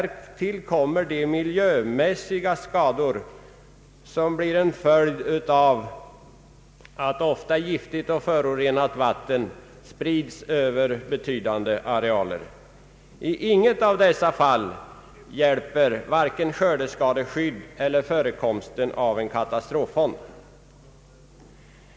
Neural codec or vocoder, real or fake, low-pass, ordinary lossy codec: none; real; none; none